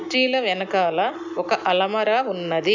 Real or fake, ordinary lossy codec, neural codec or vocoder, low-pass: real; none; none; 7.2 kHz